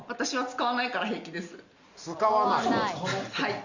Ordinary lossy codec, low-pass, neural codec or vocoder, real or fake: Opus, 64 kbps; 7.2 kHz; none; real